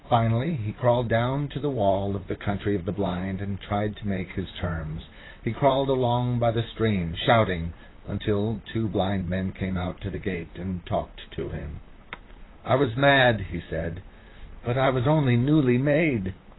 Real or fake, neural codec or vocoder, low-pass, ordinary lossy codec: fake; vocoder, 44.1 kHz, 128 mel bands, Pupu-Vocoder; 7.2 kHz; AAC, 16 kbps